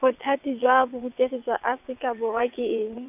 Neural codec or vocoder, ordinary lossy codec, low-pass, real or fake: vocoder, 22.05 kHz, 80 mel bands, WaveNeXt; none; 3.6 kHz; fake